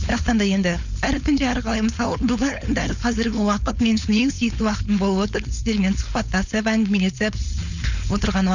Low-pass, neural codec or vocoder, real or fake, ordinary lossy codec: 7.2 kHz; codec, 16 kHz, 4.8 kbps, FACodec; fake; none